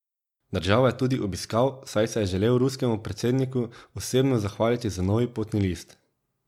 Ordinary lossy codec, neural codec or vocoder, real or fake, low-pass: MP3, 96 kbps; none; real; 19.8 kHz